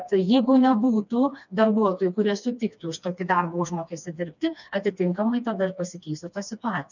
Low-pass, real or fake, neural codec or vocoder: 7.2 kHz; fake; codec, 16 kHz, 2 kbps, FreqCodec, smaller model